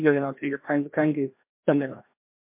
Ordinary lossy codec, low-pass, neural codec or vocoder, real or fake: MP3, 32 kbps; 3.6 kHz; codec, 16 kHz, 1 kbps, FreqCodec, larger model; fake